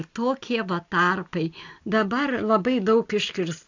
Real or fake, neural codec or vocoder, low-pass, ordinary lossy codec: real; none; 7.2 kHz; AAC, 48 kbps